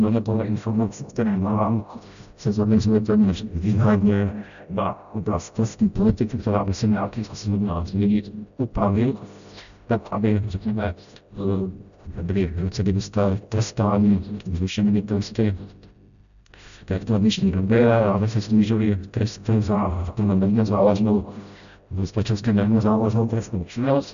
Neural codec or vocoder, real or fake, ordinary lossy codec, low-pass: codec, 16 kHz, 0.5 kbps, FreqCodec, smaller model; fake; MP3, 96 kbps; 7.2 kHz